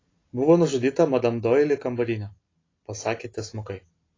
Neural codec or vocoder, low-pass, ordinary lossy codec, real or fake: none; 7.2 kHz; AAC, 32 kbps; real